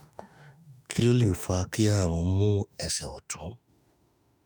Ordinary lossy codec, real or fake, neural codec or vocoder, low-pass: none; fake; autoencoder, 48 kHz, 32 numbers a frame, DAC-VAE, trained on Japanese speech; none